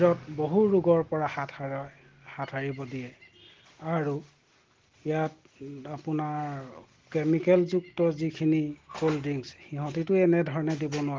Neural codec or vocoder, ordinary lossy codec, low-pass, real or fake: none; Opus, 16 kbps; 7.2 kHz; real